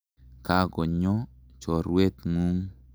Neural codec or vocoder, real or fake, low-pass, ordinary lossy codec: none; real; none; none